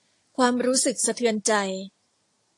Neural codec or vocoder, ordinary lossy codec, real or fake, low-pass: none; AAC, 48 kbps; real; 10.8 kHz